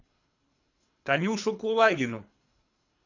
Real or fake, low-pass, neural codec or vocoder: fake; 7.2 kHz; codec, 24 kHz, 3 kbps, HILCodec